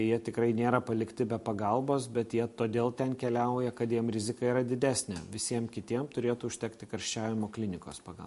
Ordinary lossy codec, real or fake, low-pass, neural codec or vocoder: MP3, 48 kbps; real; 14.4 kHz; none